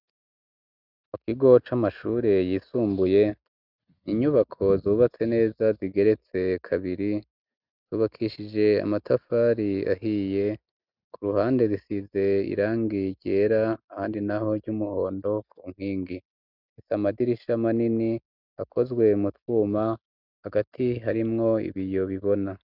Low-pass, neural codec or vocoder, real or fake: 5.4 kHz; none; real